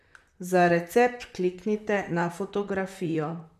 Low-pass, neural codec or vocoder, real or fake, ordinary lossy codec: 14.4 kHz; vocoder, 44.1 kHz, 128 mel bands, Pupu-Vocoder; fake; none